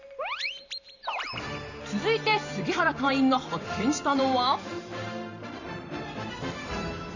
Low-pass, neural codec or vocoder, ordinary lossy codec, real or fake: 7.2 kHz; vocoder, 44.1 kHz, 128 mel bands every 512 samples, BigVGAN v2; none; fake